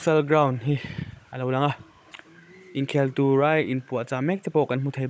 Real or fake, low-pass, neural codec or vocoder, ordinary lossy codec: fake; none; codec, 16 kHz, 16 kbps, FunCodec, trained on Chinese and English, 50 frames a second; none